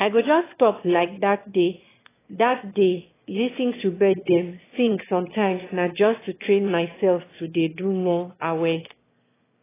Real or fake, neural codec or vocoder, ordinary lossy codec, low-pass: fake; autoencoder, 22.05 kHz, a latent of 192 numbers a frame, VITS, trained on one speaker; AAC, 16 kbps; 3.6 kHz